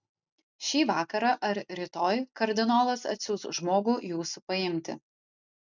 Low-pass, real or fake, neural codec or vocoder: 7.2 kHz; real; none